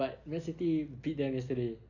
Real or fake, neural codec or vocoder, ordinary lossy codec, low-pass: real; none; none; 7.2 kHz